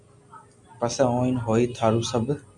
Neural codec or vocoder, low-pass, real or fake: none; 10.8 kHz; real